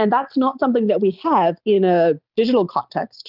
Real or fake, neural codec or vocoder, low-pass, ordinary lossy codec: real; none; 5.4 kHz; Opus, 24 kbps